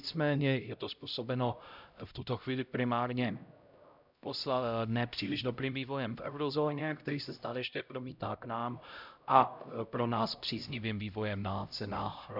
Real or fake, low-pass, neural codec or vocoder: fake; 5.4 kHz; codec, 16 kHz, 0.5 kbps, X-Codec, HuBERT features, trained on LibriSpeech